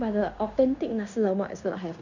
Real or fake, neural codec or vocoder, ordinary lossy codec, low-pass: fake; codec, 16 kHz in and 24 kHz out, 0.9 kbps, LongCat-Audio-Codec, fine tuned four codebook decoder; none; 7.2 kHz